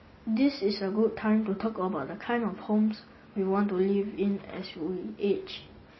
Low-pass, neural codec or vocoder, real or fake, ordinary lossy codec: 7.2 kHz; none; real; MP3, 24 kbps